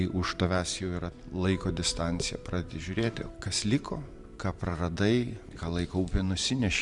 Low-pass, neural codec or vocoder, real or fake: 10.8 kHz; vocoder, 44.1 kHz, 128 mel bands every 512 samples, BigVGAN v2; fake